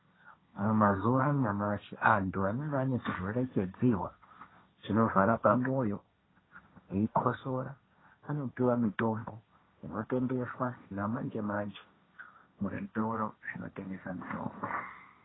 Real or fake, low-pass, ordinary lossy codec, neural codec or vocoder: fake; 7.2 kHz; AAC, 16 kbps; codec, 16 kHz, 1.1 kbps, Voila-Tokenizer